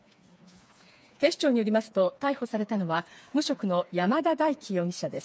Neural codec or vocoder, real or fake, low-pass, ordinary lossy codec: codec, 16 kHz, 4 kbps, FreqCodec, smaller model; fake; none; none